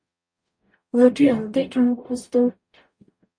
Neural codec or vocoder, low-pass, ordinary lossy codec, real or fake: codec, 44.1 kHz, 0.9 kbps, DAC; 9.9 kHz; MP3, 48 kbps; fake